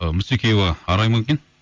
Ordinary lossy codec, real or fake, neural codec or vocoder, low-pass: Opus, 32 kbps; real; none; 7.2 kHz